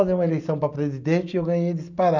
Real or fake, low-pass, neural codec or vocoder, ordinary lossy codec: real; 7.2 kHz; none; none